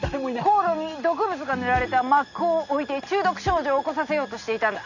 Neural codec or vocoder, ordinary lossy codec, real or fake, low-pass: none; none; real; 7.2 kHz